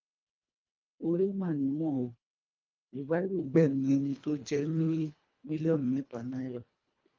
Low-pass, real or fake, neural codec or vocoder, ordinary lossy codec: 7.2 kHz; fake; codec, 24 kHz, 1.5 kbps, HILCodec; Opus, 32 kbps